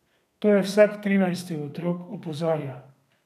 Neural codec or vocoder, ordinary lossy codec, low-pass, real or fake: codec, 32 kHz, 1.9 kbps, SNAC; none; 14.4 kHz; fake